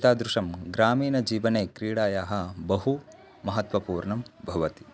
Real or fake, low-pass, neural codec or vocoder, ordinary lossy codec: real; none; none; none